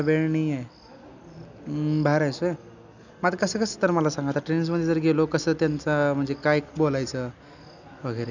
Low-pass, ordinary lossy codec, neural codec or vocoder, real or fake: 7.2 kHz; none; none; real